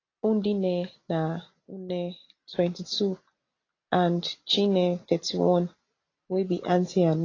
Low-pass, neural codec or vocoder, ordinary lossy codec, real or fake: 7.2 kHz; none; AAC, 32 kbps; real